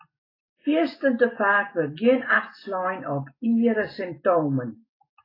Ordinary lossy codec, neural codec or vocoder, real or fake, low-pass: AAC, 24 kbps; none; real; 5.4 kHz